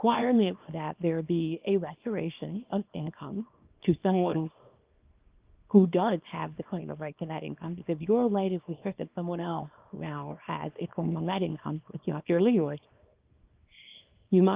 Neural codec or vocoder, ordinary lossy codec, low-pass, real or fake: codec, 24 kHz, 0.9 kbps, WavTokenizer, small release; Opus, 24 kbps; 3.6 kHz; fake